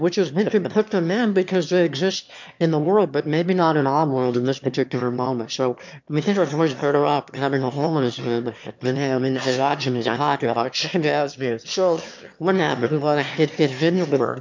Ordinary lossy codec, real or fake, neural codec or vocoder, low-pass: MP3, 64 kbps; fake; autoencoder, 22.05 kHz, a latent of 192 numbers a frame, VITS, trained on one speaker; 7.2 kHz